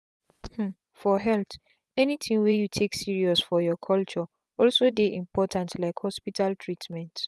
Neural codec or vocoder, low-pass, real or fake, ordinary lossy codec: vocoder, 24 kHz, 100 mel bands, Vocos; none; fake; none